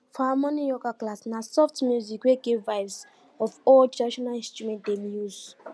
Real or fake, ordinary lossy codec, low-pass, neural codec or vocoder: real; none; none; none